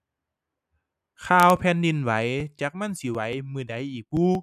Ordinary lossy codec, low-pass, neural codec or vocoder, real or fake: none; 14.4 kHz; none; real